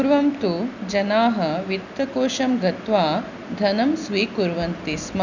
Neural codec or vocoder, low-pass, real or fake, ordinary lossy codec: none; 7.2 kHz; real; none